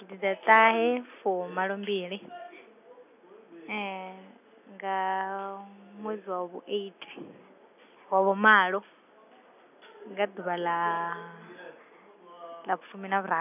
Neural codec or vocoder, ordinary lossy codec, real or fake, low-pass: none; none; real; 3.6 kHz